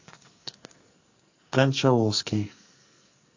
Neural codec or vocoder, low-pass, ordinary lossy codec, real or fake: codec, 44.1 kHz, 2.6 kbps, SNAC; 7.2 kHz; AAC, 48 kbps; fake